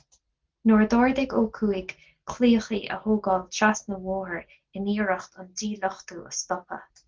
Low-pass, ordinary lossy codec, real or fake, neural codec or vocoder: 7.2 kHz; Opus, 16 kbps; real; none